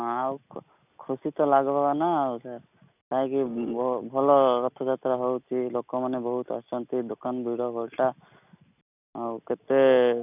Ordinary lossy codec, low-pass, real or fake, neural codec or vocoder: none; 3.6 kHz; real; none